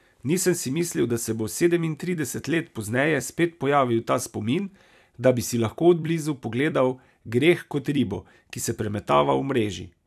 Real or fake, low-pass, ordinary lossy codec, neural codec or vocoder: fake; 14.4 kHz; none; vocoder, 44.1 kHz, 128 mel bands every 256 samples, BigVGAN v2